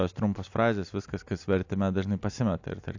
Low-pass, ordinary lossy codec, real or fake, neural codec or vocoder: 7.2 kHz; AAC, 48 kbps; real; none